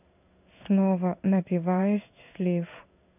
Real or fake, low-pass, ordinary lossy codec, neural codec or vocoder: fake; 3.6 kHz; none; codec, 16 kHz in and 24 kHz out, 1 kbps, XY-Tokenizer